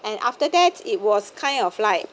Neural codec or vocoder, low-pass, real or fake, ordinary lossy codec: none; none; real; none